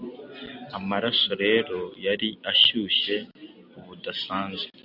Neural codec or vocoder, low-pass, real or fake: none; 5.4 kHz; real